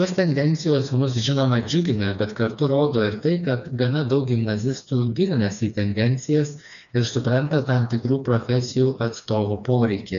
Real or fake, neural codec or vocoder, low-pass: fake; codec, 16 kHz, 2 kbps, FreqCodec, smaller model; 7.2 kHz